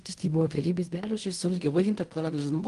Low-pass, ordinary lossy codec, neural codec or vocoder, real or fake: 10.8 kHz; Opus, 24 kbps; codec, 16 kHz in and 24 kHz out, 0.4 kbps, LongCat-Audio-Codec, fine tuned four codebook decoder; fake